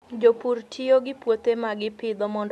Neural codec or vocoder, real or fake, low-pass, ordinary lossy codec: none; real; none; none